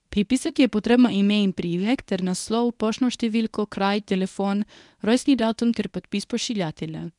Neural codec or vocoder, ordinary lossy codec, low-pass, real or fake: codec, 24 kHz, 0.9 kbps, WavTokenizer, medium speech release version 1; none; 10.8 kHz; fake